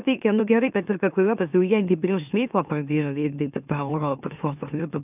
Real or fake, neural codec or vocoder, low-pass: fake; autoencoder, 44.1 kHz, a latent of 192 numbers a frame, MeloTTS; 3.6 kHz